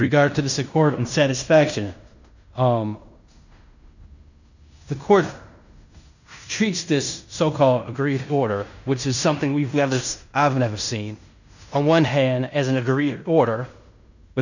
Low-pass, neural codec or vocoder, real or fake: 7.2 kHz; codec, 16 kHz in and 24 kHz out, 0.9 kbps, LongCat-Audio-Codec, fine tuned four codebook decoder; fake